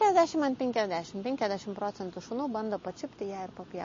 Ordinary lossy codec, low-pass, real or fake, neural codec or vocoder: MP3, 32 kbps; 7.2 kHz; real; none